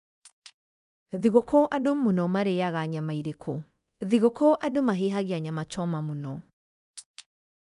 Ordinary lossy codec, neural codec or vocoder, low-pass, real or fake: none; codec, 24 kHz, 0.9 kbps, DualCodec; 10.8 kHz; fake